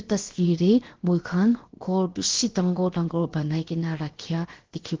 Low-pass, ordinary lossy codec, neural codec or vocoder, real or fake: 7.2 kHz; Opus, 16 kbps; codec, 16 kHz, 0.7 kbps, FocalCodec; fake